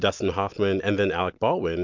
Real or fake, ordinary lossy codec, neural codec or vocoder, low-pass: real; MP3, 64 kbps; none; 7.2 kHz